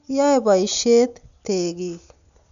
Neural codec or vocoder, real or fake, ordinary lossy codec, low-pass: none; real; none; 7.2 kHz